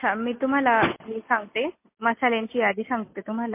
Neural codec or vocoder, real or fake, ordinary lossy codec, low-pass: none; real; MP3, 24 kbps; 3.6 kHz